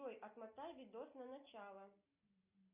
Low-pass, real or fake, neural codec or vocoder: 3.6 kHz; real; none